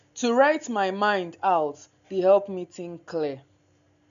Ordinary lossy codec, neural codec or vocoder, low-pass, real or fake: MP3, 96 kbps; none; 7.2 kHz; real